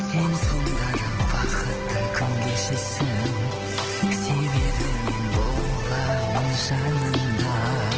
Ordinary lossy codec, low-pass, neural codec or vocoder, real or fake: Opus, 16 kbps; 7.2 kHz; none; real